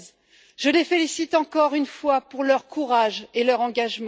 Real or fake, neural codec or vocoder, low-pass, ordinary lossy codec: real; none; none; none